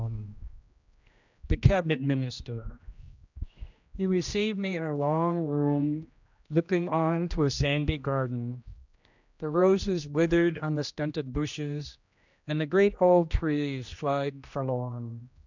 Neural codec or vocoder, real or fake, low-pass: codec, 16 kHz, 1 kbps, X-Codec, HuBERT features, trained on general audio; fake; 7.2 kHz